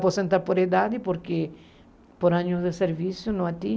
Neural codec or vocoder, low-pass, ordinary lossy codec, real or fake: none; none; none; real